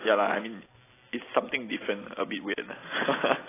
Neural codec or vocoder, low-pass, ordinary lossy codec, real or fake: none; 3.6 kHz; AAC, 16 kbps; real